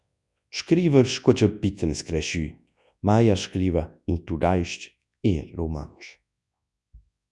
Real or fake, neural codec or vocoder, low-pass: fake; codec, 24 kHz, 0.9 kbps, WavTokenizer, large speech release; 10.8 kHz